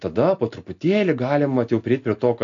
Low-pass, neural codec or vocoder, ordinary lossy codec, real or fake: 7.2 kHz; none; AAC, 48 kbps; real